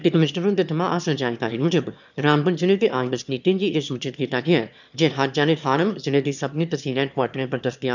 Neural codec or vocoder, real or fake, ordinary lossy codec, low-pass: autoencoder, 22.05 kHz, a latent of 192 numbers a frame, VITS, trained on one speaker; fake; none; 7.2 kHz